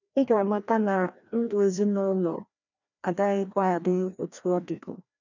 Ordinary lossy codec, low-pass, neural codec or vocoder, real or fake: none; 7.2 kHz; codec, 16 kHz, 1 kbps, FreqCodec, larger model; fake